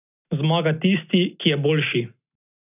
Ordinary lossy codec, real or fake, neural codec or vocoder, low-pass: none; real; none; 3.6 kHz